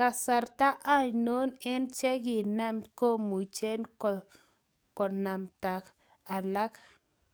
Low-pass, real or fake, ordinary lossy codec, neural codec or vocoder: none; fake; none; codec, 44.1 kHz, 7.8 kbps, DAC